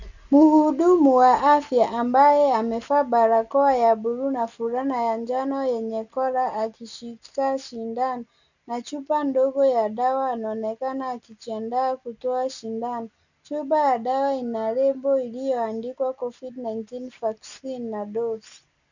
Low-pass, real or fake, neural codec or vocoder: 7.2 kHz; real; none